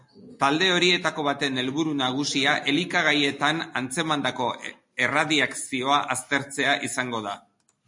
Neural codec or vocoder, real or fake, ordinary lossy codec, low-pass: none; real; MP3, 48 kbps; 10.8 kHz